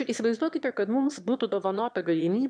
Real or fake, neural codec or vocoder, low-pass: fake; autoencoder, 22.05 kHz, a latent of 192 numbers a frame, VITS, trained on one speaker; 9.9 kHz